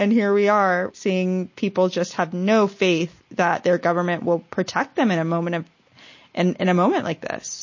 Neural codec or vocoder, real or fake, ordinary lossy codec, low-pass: none; real; MP3, 32 kbps; 7.2 kHz